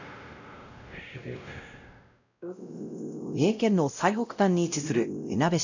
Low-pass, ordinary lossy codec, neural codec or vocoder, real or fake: 7.2 kHz; none; codec, 16 kHz, 0.5 kbps, X-Codec, WavLM features, trained on Multilingual LibriSpeech; fake